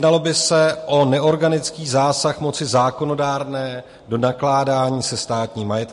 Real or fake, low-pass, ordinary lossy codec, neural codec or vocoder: real; 14.4 kHz; MP3, 48 kbps; none